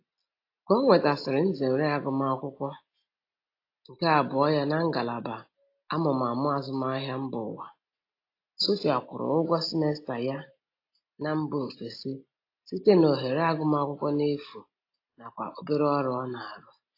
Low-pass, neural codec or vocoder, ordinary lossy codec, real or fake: 5.4 kHz; none; AAC, 32 kbps; real